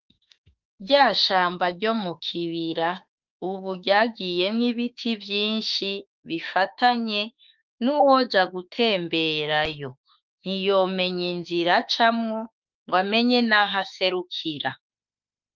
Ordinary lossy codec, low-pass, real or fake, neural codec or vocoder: Opus, 24 kbps; 7.2 kHz; fake; autoencoder, 48 kHz, 32 numbers a frame, DAC-VAE, trained on Japanese speech